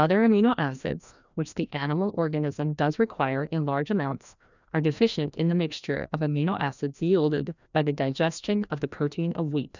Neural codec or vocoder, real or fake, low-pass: codec, 16 kHz, 1 kbps, FreqCodec, larger model; fake; 7.2 kHz